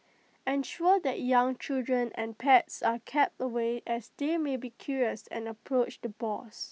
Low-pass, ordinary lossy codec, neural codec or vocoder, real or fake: none; none; none; real